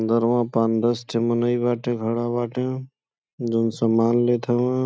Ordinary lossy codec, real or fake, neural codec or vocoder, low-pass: none; real; none; none